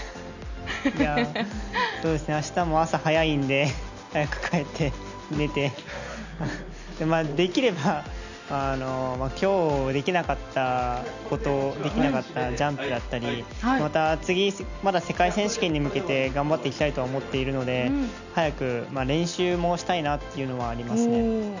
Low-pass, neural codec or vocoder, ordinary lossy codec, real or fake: 7.2 kHz; none; none; real